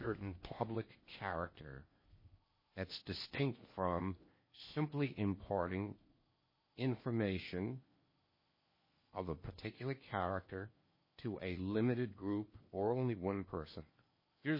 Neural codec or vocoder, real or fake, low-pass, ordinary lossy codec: codec, 16 kHz in and 24 kHz out, 0.8 kbps, FocalCodec, streaming, 65536 codes; fake; 5.4 kHz; MP3, 24 kbps